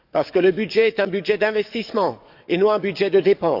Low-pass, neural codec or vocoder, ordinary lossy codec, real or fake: 5.4 kHz; codec, 44.1 kHz, 7.8 kbps, DAC; none; fake